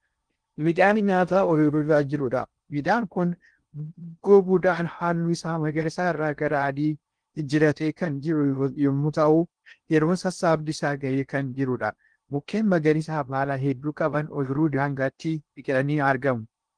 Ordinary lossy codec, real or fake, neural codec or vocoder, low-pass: Opus, 24 kbps; fake; codec, 16 kHz in and 24 kHz out, 0.6 kbps, FocalCodec, streaming, 2048 codes; 9.9 kHz